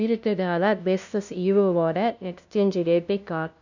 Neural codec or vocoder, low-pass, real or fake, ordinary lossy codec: codec, 16 kHz, 0.5 kbps, FunCodec, trained on LibriTTS, 25 frames a second; 7.2 kHz; fake; none